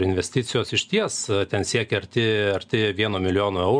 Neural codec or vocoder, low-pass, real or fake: none; 9.9 kHz; real